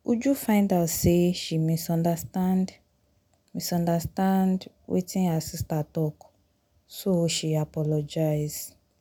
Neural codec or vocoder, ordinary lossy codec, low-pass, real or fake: none; none; none; real